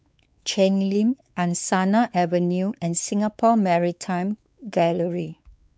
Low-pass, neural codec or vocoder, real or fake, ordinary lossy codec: none; codec, 16 kHz, 4 kbps, X-Codec, WavLM features, trained on Multilingual LibriSpeech; fake; none